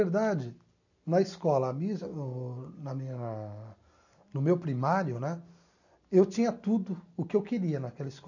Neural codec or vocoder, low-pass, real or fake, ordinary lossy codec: none; 7.2 kHz; real; none